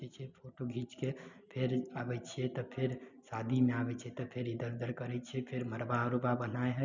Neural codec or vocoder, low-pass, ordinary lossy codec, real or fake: none; 7.2 kHz; none; real